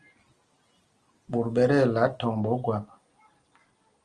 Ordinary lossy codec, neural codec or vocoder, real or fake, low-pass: Opus, 24 kbps; none; real; 9.9 kHz